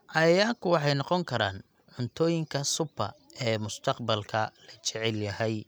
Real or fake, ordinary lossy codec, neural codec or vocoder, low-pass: real; none; none; none